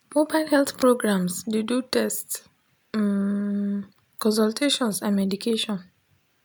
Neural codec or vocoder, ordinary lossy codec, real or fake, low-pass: none; none; real; none